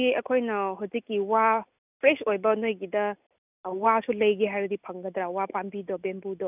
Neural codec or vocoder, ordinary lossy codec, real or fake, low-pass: none; none; real; 3.6 kHz